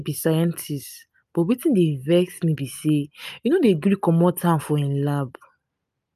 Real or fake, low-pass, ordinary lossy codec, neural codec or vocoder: real; 14.4 kHz; none; none